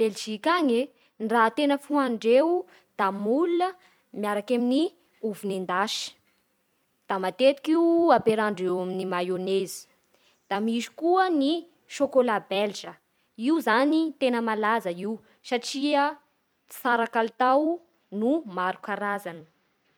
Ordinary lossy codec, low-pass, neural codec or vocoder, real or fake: MP3, 96 kbps; 19.8 kHz; vocoder, 44.1 kHz, 128 mel bands every 256 samples, BigVGAN v2; fake